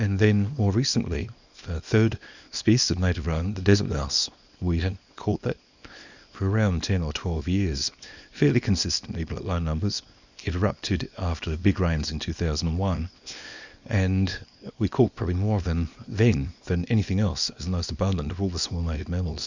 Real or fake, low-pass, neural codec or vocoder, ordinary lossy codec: fake; 7.2 kHz; codec, 24 kHz, 0.9 kbps, WavTokenizer, small release; Opus, 64 kbps